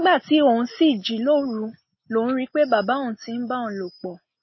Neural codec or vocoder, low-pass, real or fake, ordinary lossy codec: none; 7.2 kHz; real; MP3, 24 kbps